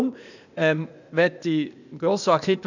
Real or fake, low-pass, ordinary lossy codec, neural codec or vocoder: fake; 7.2 kHz; none; codec, 16 kHz, 0.8 kbps, ZipCodec